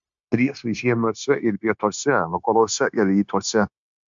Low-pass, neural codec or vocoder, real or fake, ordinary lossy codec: 7.2 kHz; codec, 16 kHz, 0.9 kbps, LongCat-Audio-Codec; fake; MP3, 64 kbps